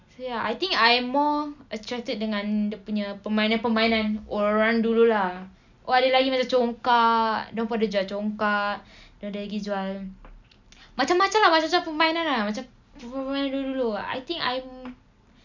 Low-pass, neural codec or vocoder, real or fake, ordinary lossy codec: 7.2 kHz; none; real; none